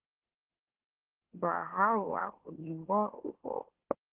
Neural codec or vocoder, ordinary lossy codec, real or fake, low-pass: autoencoder, 44.1 kHz, a latent of 192 numbers a frame, MeloTTS; Opus, 16 kbps; fake; 3.6 kHz